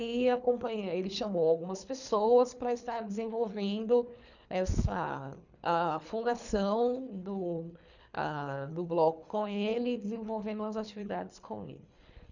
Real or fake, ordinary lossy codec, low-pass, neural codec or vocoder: fake; none; 7.2 kHz; codec, 24 kHz, 3 kbps, HILCodec